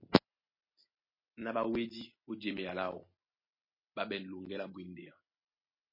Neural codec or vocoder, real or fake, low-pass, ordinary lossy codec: none; real; 5.4 kHz; MP3, 24 kbps